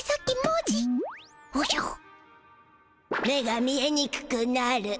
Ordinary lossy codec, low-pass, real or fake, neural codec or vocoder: none; none; real; none